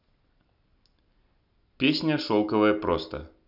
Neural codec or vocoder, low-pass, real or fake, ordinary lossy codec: none; 5.4 kHz; real; none